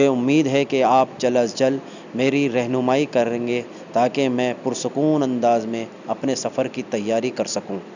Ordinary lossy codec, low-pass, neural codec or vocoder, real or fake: none; 7.2 kHz; none; real